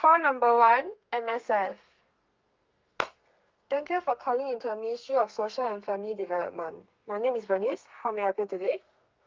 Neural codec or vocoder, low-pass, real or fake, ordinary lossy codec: codec, 32 kHz, 1.9 kbps, SNAC; 7.2 kHz; fake; Opus, 24 kbps